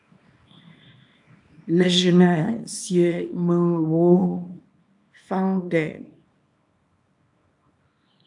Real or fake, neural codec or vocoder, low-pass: fake; codec, 24 kHz, 0.9 kbps, WavTokenizer, small release; 10.8 kHz